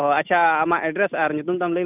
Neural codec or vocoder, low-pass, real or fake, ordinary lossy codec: none; 3.6 kHz; real; none